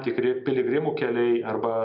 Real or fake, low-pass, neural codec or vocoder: real; 5.4 kHz; none